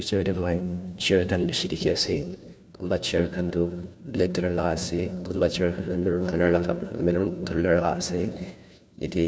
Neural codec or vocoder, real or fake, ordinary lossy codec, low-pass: codec, 16 kHz, 1 kbps, FunCodec, trained on LibriTTS, 50 frames a second; fake; none; none